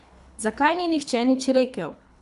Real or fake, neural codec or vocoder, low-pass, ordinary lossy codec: fake; codec, 24 kHz, 3 kbps, HILCodec; 10.8 kHz; none